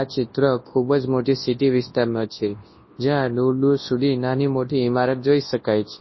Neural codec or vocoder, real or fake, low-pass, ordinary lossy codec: codec, 24 kHz, 0.9 kbps, WavTokenizer, large speech release; fake; 7.2 kHz; MP3, 24 kbps